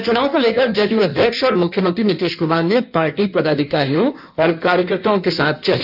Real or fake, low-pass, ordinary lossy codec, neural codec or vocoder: fake; 5.4 kHz; none; codec, 16 kHz in and 24 kHz out, 1.1 kbps, FireRedTTS-2 codec